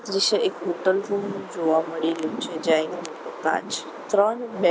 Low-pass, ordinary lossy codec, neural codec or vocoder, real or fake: none; none; none; real